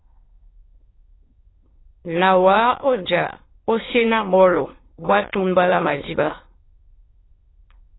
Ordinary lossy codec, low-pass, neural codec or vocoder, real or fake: AAC, 16 kbps; 7.2 kHz; autoencoder, 22.05 kHz, a latent of 192 numbers a frame, VITS, trained on many speakers; fake